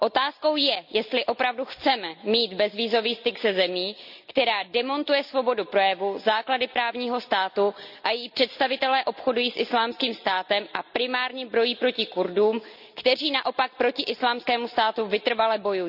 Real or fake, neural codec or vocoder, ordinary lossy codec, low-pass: real; none; none; 5.4 kHz